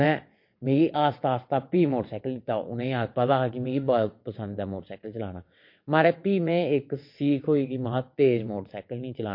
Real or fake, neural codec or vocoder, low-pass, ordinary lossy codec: fake; vocoder, 22.05 kHz, 80 mel bands, WaveNeXt; 5.4 kHz; MP3, 32 kbps